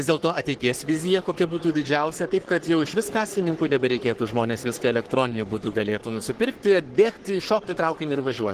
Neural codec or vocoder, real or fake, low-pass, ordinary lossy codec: codec, 44.1 kHz, 3.4 kbps, Pupu-Codec; fake; 14.4 kHz; Opus, 16 kbps